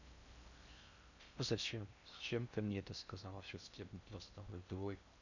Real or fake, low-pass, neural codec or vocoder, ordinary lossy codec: fake; 7.2 kHz; codec, 16 kHz in and 24 kHz out, 0.6 kbps, FocalCodec, streaming, 4096 codes; none